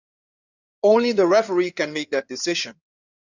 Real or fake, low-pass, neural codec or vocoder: fake; 7.2 kHz; codec, 16 kHz in and 24 kHz out, 2.2 kbps, FireRedTTS-2 codec